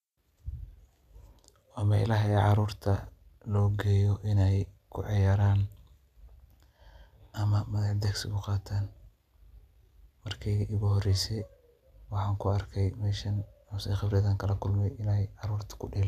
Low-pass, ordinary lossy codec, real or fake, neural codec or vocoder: 14.4 kHz; none; real; none